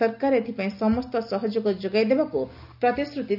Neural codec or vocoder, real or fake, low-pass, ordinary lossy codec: none; real; 5.4 kHz; none